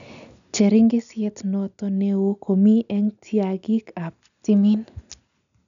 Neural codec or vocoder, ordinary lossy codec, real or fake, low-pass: none; none; real; 7.2 kHz